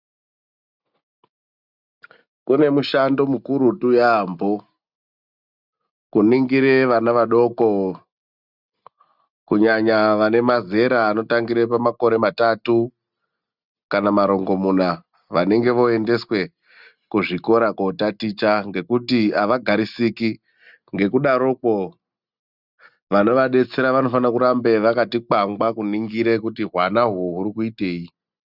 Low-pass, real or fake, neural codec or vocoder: 5.4 kHz; real; none